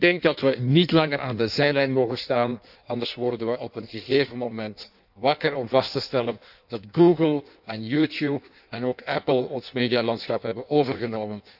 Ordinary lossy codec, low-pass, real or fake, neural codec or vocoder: none; 5.4 kHz; fake; codec, 16 kHz in and 24 kHz out, 1.1 kbps, FireRedTTS-2 codec